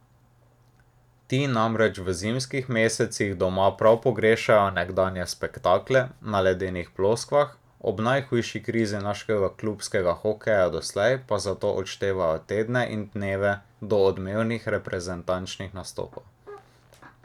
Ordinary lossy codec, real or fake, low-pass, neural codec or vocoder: none; real; 19.8 kHz; none